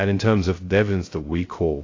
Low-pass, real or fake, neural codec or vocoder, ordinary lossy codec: 7.2 kHz; fake; codec, 16 kHz, 0.2 kbps, FocalCodec; AAC, 32 kbps